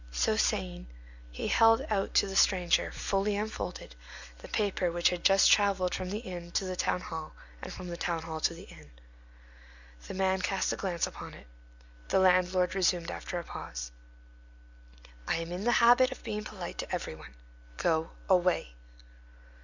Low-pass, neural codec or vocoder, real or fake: 7.2 kHz; none; real